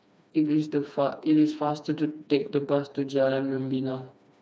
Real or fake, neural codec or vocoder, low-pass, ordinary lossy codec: fake; codec, 16 kHz, 2 kbps, FreqCodec, smaller model; none; none